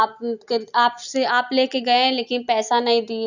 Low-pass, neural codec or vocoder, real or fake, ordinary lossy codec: 7.2 kHz; none; real; none